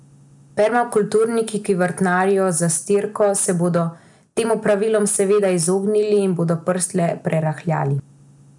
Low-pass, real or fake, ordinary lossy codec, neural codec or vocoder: 10.8 kHz; real; none; none